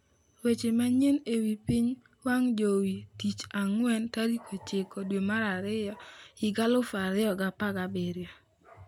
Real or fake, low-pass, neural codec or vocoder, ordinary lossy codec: real; 19.8 kHz; none; none